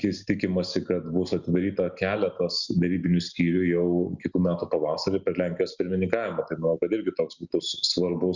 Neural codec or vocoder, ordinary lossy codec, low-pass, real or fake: none; Opus, 64 kbps; 7.2 kHz; real